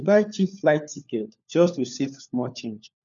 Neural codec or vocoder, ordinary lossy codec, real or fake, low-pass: codec, 16 kHz, 4 kbps, FunCodec, trained on LibriTTS, 50 frames a second; none; fake; 7.2 kHz